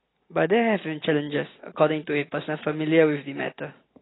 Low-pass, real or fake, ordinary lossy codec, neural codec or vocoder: 7.2 kHz; real; AAC, 16 kbps; none